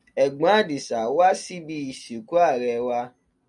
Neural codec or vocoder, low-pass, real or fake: none; 10.8 kHz; real